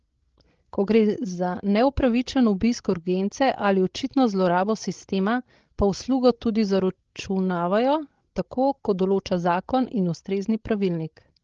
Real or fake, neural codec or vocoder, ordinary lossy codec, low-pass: fake; codec, 16 kHz, 16 kbps, FreqCodec, larger model; Opus, 16 kbps; 7.2 kHz